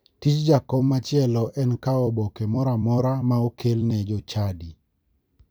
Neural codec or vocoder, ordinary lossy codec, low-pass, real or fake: vocoder, 44.1 kHz, 128 mel bands every 256 samples, BigVGAN v2; none; none; fake